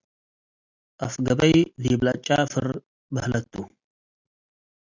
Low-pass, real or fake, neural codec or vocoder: 7.2 kHz; real; none